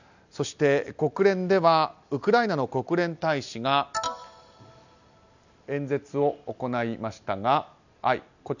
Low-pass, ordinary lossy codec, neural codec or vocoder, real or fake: 7.2 kHz; none; none; real